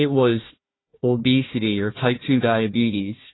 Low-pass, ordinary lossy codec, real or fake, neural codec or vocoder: 7.2 kHz; AAC, 16 kbps; fake; codec, 16 kHz, 1 kbps, FunCodec, trained on Chinese and English, 50 frames a second